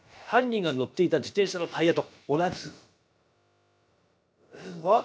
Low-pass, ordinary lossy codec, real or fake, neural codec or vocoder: none; none; fake; codec, 16 kHz, about 1 kbps, DyCAST, with the encoder's durations